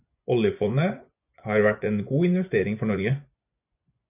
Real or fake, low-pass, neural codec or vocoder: fake; 3.6 kHz; vocoder, 24 kHz, 100 mel bands, Vocos